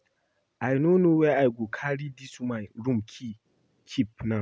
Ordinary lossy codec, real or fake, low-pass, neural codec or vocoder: none; real; none; none